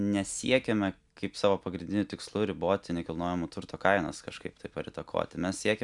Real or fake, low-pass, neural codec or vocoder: real; 10.8 kHz; none